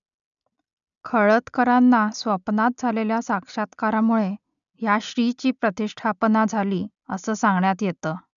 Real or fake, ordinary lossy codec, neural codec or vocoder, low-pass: real; none; none; 7.2 kHz